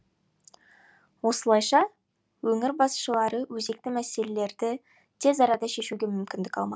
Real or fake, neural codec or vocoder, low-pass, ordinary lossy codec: real; none; none; none